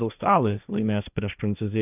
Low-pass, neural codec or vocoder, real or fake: 3.6 kHz; codec, 16 kHz, 1.1 kbps, Voila-Tokenizer; fake